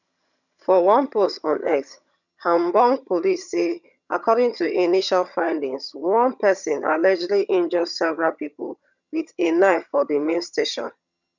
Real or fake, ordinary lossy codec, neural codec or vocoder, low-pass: fake; none; vocoder, 22.05 kHz, 80 mel bands, HiFi-GAN; 7.2 kHz